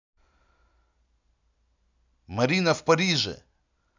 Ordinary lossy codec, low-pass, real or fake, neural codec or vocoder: none; 7.2 kHz; real; none